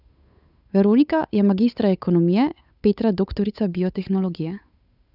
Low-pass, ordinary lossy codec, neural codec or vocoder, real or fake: 5.4 kHz; none; codec, 16 kHz, 8 kbps, FunCodec, trained on Chinese and English, 25 frames a second; fake